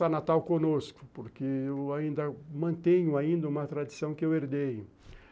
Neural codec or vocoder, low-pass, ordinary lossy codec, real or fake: none; none; none; real